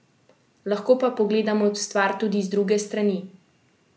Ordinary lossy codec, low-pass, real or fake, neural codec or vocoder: none; none; real; none